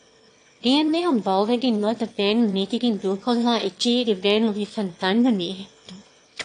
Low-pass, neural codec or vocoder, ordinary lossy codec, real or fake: 9.9 kHz; autoencoder, 22.05 kHz, a latent of 192 numbers a frame, VITS, trained on one speaker; AAC, 48 kbps; fake